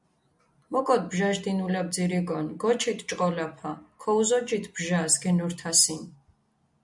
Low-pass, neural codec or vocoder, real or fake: 10.8 kHz; none; real